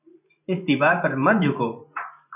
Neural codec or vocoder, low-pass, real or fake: vocoder, 44.1 kHz, 128 mel bands every 512 samples, BigVGAN v2; 3.6 kHz; fake